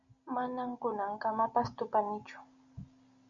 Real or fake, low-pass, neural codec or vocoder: real; 7.2 kHz; none